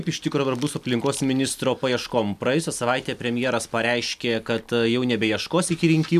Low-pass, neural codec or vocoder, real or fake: 14.4 kHz; none; real